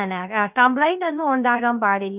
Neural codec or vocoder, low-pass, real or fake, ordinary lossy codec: codec, 16 kHz, 0.3 kbps, FocalCodec; 3.6 kHz; fake; none